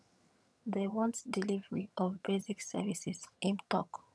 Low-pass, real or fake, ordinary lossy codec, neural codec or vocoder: none; fake; none; vocoder, 22.05 kHz, 80 mel bands, HiFi-GAN